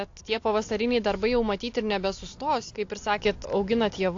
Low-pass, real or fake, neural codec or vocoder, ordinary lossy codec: 7.2 kHz; real; none; AAC, 48 kbps